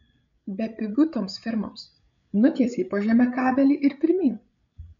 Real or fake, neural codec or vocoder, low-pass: fake; codec, 16 kHz, 8 kbps, FreqCodec, larger model; 7.2 kHz